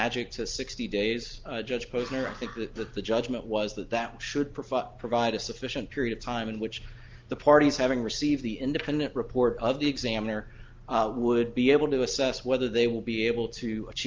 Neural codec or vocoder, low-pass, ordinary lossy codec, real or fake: none; 7.2 kHz; Opus, 32 kbps; real